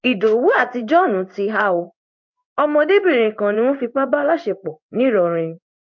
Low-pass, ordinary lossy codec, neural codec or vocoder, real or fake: 7.2 kHz; MP3, 48 kbps; codec, 16 kHz in and 24 kHz out, 1 kbps, XY-Tokenizer; fake